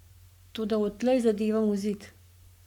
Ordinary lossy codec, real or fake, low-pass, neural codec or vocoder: none; fake; 19.8 kHz; codec, 44.1 kHz, 7.8 kbps, Pupu-Codec